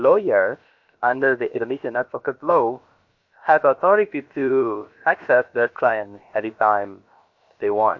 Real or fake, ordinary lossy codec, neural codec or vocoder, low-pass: fake; MP3, 48 kbps; codec, 16 kHz, about 1 kbps, DyCAST, with the encoder's durations; 7.2 kHz